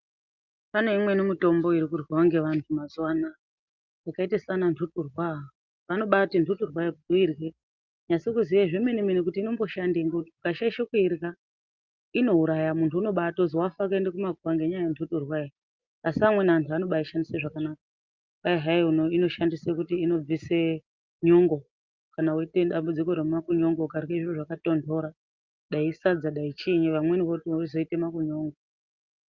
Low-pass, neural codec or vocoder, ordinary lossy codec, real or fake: 7.2 kHz; none; Opus, 24 kbps; real